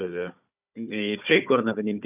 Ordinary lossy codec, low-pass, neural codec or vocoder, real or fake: none; 3.6 kHz; codec, 16 kHz, 4 kbps, FunCodec, trained on Chinese and English, 50 frames a second; fake